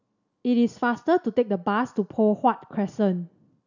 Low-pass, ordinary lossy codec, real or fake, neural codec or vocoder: 7.2 kHz; none; real; none